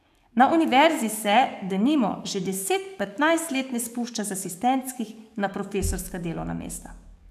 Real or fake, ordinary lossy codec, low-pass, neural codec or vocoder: fake; none; 14.4 kHz; codec, 44.1 kHz, 7.8 kbps, DAC